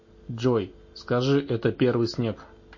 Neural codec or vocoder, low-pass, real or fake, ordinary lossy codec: none; 7.2 kHz; real; MP3, 32 kbps